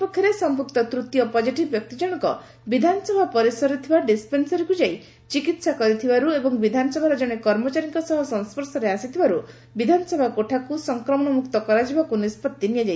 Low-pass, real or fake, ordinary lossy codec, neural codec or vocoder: none; real; none; none